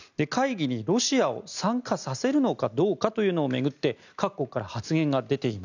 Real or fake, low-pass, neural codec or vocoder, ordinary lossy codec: real; 7.2 kHz; none; none